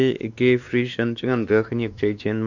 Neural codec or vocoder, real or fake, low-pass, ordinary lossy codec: codec, 16 kHz, 2 kbps, X-Codec, WavLM features, trained on Multilingual LibriSpeech; fake; 7.2 kHz; none